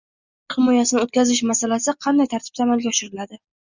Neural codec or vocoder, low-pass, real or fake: none; 7.2 kHz; real